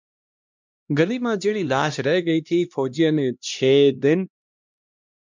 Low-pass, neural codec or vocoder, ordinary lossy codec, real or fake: 7.2 kHz; codec, 16 kHz, 1 kbps, X-Codec, HuBERT features, trained on LibriSpeech; MP3, 64 kbps; fake